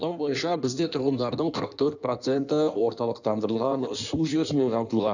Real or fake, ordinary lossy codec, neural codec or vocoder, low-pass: fake; none; codec, 16 kHz in and 24 kHz out, 1.1 kbps, FireRedTTS-2 codec; 7.2 kHz